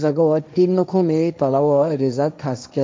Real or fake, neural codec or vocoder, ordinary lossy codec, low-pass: fake; codec, 16 kHz, 1.1 kbps, Voila-Tokenizer; none; none